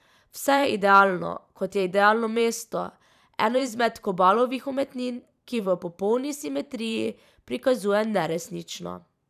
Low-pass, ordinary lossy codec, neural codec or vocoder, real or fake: 14.4 kHz; none; vocoder, 44.1 kHz, 128 mel bands every 512 samples, BigVGAN v2; fake